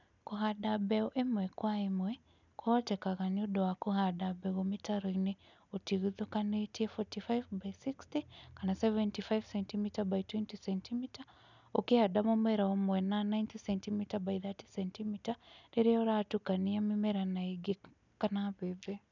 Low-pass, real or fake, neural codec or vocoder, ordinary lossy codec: 7.2 kHz; real; none; none